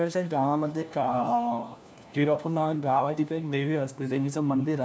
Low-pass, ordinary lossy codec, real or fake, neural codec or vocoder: none; none; fake; codec, 16 kHz, 1 kbps, FunCodec, trained on LibriTTS, 50 frames a second